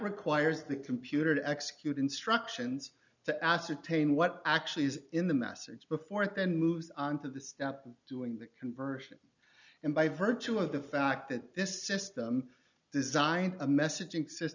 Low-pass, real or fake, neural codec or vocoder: 7.2 kHz; real; none